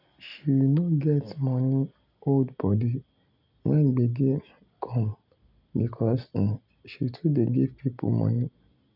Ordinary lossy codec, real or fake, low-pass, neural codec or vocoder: none; real; 5.4 kHz; none